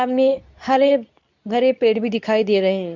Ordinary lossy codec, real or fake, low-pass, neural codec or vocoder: none; fake; 7.2 kHz; codec, 24 kHz, 0.9 kbps, WavTokenizer, medium speech release version 2